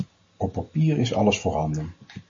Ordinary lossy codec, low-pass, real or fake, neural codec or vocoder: MP3, 32 kbps; 7.2 kHz; real; none